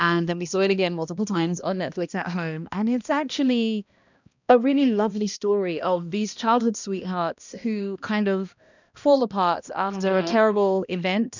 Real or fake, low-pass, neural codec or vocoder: fake; 7.2 kHz; codec, 16 kHz, 1 kbps, X-Codec, HuBERT features, trained on balanced general audio